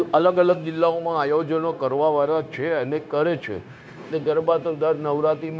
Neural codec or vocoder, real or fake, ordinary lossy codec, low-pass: codec, 16 kHz, 0.9 kbps, LongCat-Audio-Codec; fake; none; none